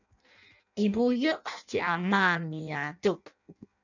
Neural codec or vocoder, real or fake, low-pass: codec, 16 kHz in and 24 kHz out, 0.6 kbps, FireRedTTS-2 codec; fake; 7.2 kHz